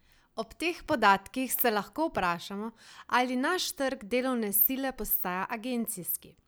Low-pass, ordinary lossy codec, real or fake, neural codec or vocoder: none; none; real; none